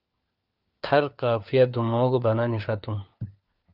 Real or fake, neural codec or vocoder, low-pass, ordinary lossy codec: fake; codec, 16 kHz, 4 kbps, FunCodec, trained on LibriTTS, 50 frames a second; 5.4 kHz; Opus, 24 kbps